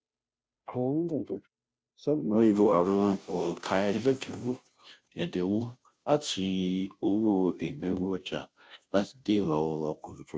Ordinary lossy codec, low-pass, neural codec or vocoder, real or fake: none; none; codec, 16 kHz, 0.5 kbps, FunCodec, trained on Chinese and English, 25 frames a second; fake